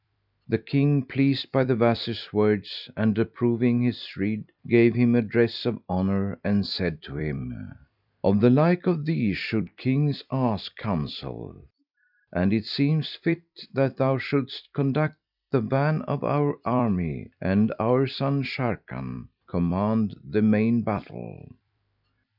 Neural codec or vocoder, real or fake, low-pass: vocoder, 44.1 kHz, 128 mel bands every 256 samples, BigVGAN v2; fake; 5.4 kHz